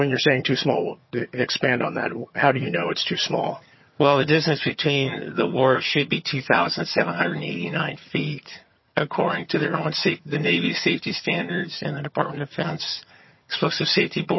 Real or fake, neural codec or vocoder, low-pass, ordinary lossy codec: fake; vocoder, 22.05 kHz, 80 mel bands, HiFi-GAN; 7.2 kHz; MP3, 24 kbps